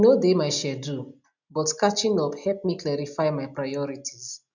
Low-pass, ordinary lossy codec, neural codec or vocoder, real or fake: 7.2 kHz; none; none; real